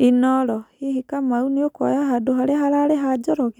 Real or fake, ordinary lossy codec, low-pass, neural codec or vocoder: real; none; 19.8 kHz; none